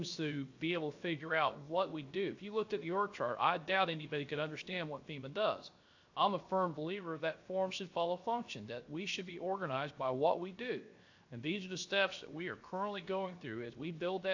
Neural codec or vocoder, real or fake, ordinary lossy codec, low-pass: codec, 16 kHz, 0.7 kbps, FocalCodec; fake; AAC, 48 kbps; 7.2 kHz